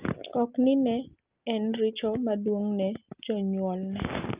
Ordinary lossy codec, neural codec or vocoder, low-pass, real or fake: Opus, 32 kbps; none; 3.6 kHz; real